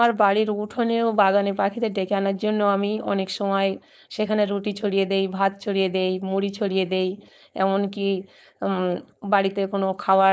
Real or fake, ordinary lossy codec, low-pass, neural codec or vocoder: fake; none; none; codec, 16 kHz, 4.8 kbps, FACodec